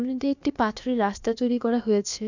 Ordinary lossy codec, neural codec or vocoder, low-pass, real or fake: none; codec, 16 kHz, about 1 kbps, DyCAST, with the encoder's durations; 7.2 kHz; fake